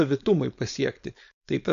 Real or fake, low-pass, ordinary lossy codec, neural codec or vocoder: fake; 7.2 kHz; AAC, 96 kbps; codec, 16 kHz, 4.8 kbps, FACodec